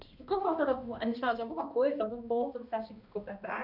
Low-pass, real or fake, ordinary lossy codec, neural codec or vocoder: 5.4 kHz; fake; none; codec, 16 kHz, 1 kbps, X-Codec, HuBERT features, trained on balanced general audio